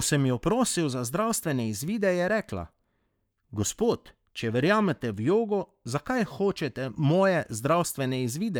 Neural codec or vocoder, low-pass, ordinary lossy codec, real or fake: codec, 44.1 kHz, 7.8 kbps, Pupu-Codec; none; none; fake